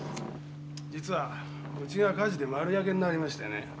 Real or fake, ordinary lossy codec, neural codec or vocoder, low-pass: real; none; none; none